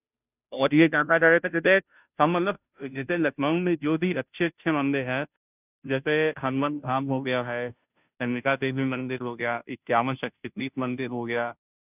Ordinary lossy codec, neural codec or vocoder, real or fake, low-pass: none; codec, 16 kHz, 0.5 kbps, FunCodec, trained on Chinese and English, 25 frames a second; fake; 3.6 kHz